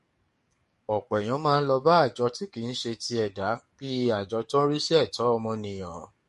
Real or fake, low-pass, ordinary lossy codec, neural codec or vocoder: fake; 14.4 kHz; MP3, 48 kbps; codec, 44.1 kHz, 7.8 kbps, DAC